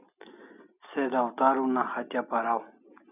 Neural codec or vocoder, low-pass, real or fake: none; 3.6 kHz; real